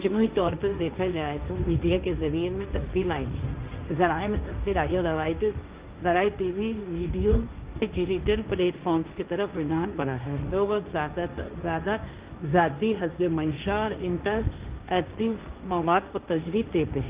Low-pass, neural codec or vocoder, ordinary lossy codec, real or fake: 3.6 kHz; codec, 16 kHz, 1.1 kbps, Voila-Tokenizer; Opus, 32 kbps; fake